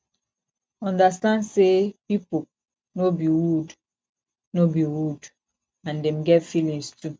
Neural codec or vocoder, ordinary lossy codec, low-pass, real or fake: none; none; none; real